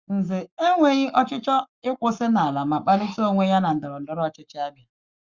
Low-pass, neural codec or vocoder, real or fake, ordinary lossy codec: 7.2 kHz; codec, 44.1 kHz, 7.8 kbps, Pupu-Codec; fake; Opus, 64 kbps